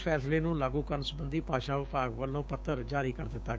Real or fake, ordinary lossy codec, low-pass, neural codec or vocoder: fake; none; none; codec, 16 kHz, 6 kbps, DAC